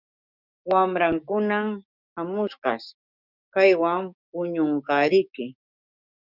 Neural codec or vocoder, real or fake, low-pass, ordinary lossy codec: codec, 16 kHz, 6 kbps, DAC; fake; 5.4 kHz; Opus, 64 kbps